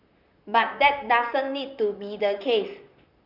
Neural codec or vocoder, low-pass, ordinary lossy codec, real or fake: vocoder, 44.1 kHz, 128 mel bands, Pupu-Vocoder; 5.4 kHz; none; fake